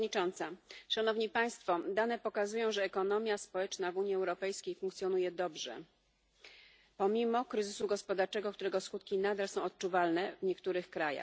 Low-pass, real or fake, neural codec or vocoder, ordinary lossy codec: none; real; none; none